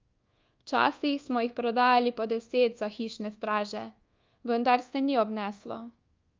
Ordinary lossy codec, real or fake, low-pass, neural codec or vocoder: Opus, 24 kbps; fake; 7.2 kHz; codec, 24 kHz, 1.2 kbps, DualCodec